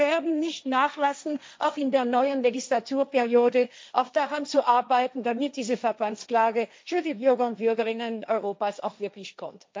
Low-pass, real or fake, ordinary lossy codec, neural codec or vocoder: none; fake; none; codec, 16 kHz, 1.1 kbps, Voila-Tokenizer